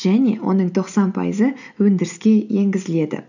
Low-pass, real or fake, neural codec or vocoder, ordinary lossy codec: 7.2 kHz; real; none; none